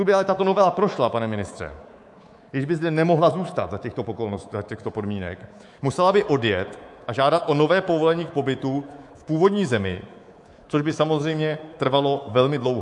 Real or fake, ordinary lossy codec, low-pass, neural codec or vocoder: fake; AAC, 64 kbps; 10.8 kHz; codec, 24 kHz, 3.1 kbps, DualCodec